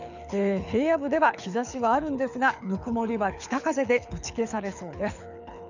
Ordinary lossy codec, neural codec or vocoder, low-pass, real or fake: none; codec, 24 kHz, 6 kbps, HILCodec; 7.2 kHz; fake